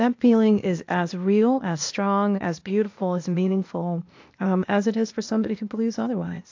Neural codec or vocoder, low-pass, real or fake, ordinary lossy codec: codec, 16 kHz, 0.8 kbps, ZipCodec; 7.2 kHz; fake; AAC, 48 kbps